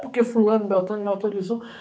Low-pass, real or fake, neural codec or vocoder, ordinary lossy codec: none; fake; codec, 16 kHz, 4 kbps, X-Codec, HuBERT features, trained on general audio; none